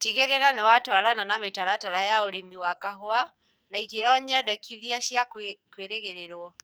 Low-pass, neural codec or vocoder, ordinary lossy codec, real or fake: none; codec, 44.1 kHz, 2.6 kbps, SNAC; none; fake